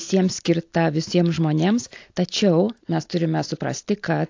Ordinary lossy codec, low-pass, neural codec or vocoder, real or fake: AAC, 48 kbps; 7.2 kHz; none; real